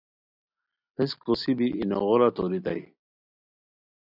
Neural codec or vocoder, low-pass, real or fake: none; 5.4 kHz; real